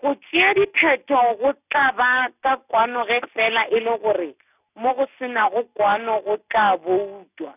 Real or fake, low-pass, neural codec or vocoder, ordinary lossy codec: real; 3.6 kHz; none; none